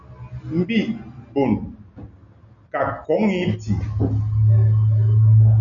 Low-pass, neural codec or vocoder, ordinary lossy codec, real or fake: 7.2 kHz; none; MP3, 96 kbps; real